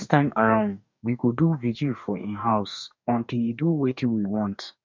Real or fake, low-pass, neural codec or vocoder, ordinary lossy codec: fake; 7.2 kHz; codec, 44.1 kHz, 2.6 kbps, DAC; MP3, 64 kbps